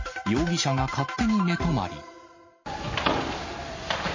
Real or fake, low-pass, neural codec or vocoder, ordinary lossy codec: real; 7.2 kHz; none; MP3, 32 kbps